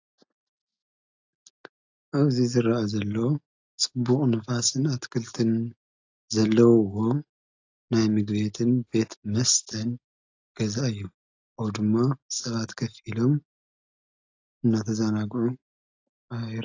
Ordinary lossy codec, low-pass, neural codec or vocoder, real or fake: AAC, 48 kbps; 7.2 kHz; none; real